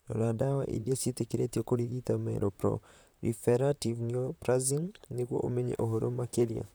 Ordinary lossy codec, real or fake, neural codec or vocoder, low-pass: none; fake; vocoder, 44.1 kHz, 128 mel bands, Pupu-Vocoder; none